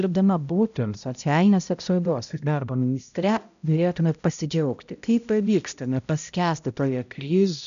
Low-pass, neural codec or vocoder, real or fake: 7.2 kHz; codec, 16 kHz, 0.5 kbps, X-Codec, HuBERT features, trained on balanced general audio; fake